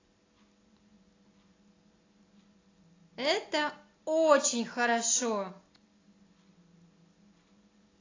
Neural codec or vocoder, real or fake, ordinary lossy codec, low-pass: none; real; AAC, 32 kbps; 7.2 kHz